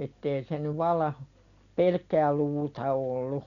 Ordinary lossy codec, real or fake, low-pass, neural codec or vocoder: none; real; 7.2 kHz; none